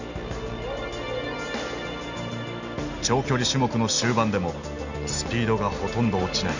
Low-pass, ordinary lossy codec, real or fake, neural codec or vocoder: 7.2 kHz; none; real; none